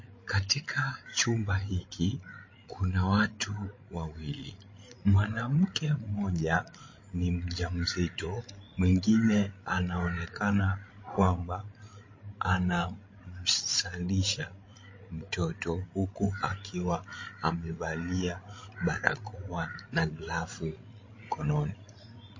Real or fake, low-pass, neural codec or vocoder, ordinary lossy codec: fake; 7.2 kHz; codec, 16 kHz, 16 kbps, FreqCodec, larger model; MP3, 32 kbps